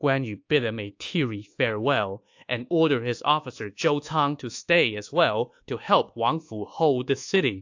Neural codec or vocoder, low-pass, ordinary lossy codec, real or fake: codec, 24 kHz, 1.2 kbps, DualCodec; 7.2 kHz; AAC, 48 kbps; fake